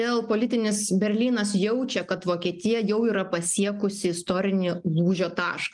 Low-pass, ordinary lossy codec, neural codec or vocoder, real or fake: 10.8 kHz; Opus, 32 kbps; none; real